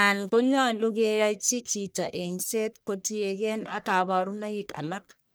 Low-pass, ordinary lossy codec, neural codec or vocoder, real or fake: none; none; codec, 44.1 kHz, 1.7 kbps, Pupu-Codec; fake